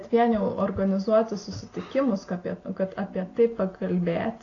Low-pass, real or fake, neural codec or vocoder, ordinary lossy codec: 7.2 kHz; real; none; AAC, 32 kbps